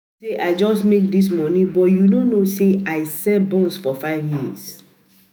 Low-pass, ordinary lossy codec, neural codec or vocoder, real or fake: none; none; autoencoder, 48 kHz, 128 numbers a frame, DAC-VAE, trained on Japanese speech; fake